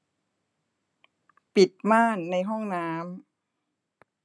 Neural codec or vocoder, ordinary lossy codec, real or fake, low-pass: none; none; real; none